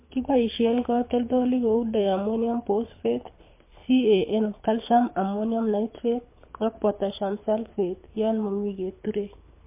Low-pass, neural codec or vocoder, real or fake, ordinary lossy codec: 3.6 kHz; codec, 24 kHz, 6 kbps, HILCodec; fake; MP3, 24 kbps